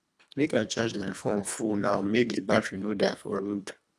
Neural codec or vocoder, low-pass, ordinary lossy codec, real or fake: codec, 24 kHz, 1.5 kbps, HILCodec; none; none; fake